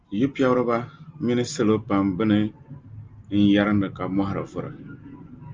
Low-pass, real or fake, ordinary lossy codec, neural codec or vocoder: 7.2 kHz; real; Opus, 24 kbps; none